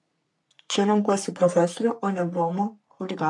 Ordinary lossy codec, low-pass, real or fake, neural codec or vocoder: MP3, 64 kbps; 10.8 kHz; fake; codec, 44.1 kHz, 3.4 kbps, Pupu-Codec